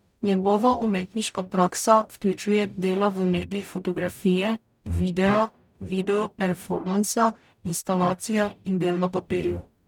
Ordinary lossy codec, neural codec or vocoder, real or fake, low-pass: none; codec, 44.1 kHz, 0.9 kbps, DAC; fake; 19.8 kHz